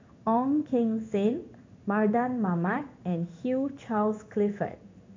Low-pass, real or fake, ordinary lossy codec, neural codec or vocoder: 7.2 kHz; fake; MP3, 48 kbps; codec, 16 kHz in and 24 kHz out, 1 kbps, XY-Tokenizer